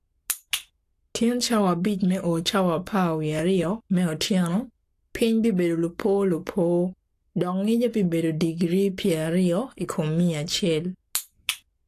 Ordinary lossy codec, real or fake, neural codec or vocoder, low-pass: AAC, 64 kbps; fake; codec, 44.1 kHz, 7.8 kbps, Pupu-Codec; 14.4 kHz